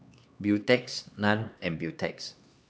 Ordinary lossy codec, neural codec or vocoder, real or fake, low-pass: none; codec, 16 kHz, 2 kbps, X-Codec, HuBERT features, trained on LibriSpeech; fake; none